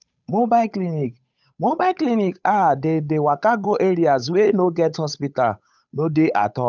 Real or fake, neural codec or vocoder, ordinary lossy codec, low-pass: fake; codec, 16 kHz, 8 kbps, FunCodec, trained on Chinese and English, 25 frames a second; none; 7.2 kHz